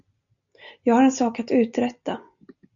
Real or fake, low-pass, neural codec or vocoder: real; 7.2 kHz; none